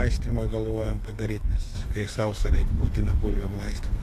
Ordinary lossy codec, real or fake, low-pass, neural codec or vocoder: AAC, 64 kbps; fake; 14.4 kHz; codec, 32 kHz, 1.9 kbps, SNAC